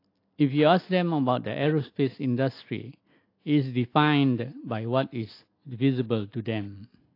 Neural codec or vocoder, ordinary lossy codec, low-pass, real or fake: none; AAC, 32 kbps; 5.4 kHz; real